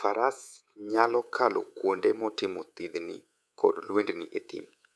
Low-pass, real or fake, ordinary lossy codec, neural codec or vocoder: none; fake; none; codec, 24 kHz, 3.1 kbps, DualCodec